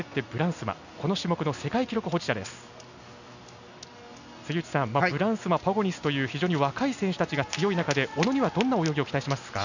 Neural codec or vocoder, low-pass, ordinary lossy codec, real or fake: none; 7.2 kHz; none; real